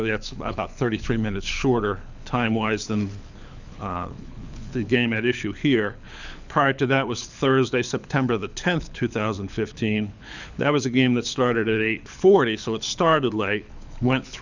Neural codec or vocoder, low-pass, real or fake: codec, 24 kHz, 6 kbps, HILCodec; 7.2 kHz; fake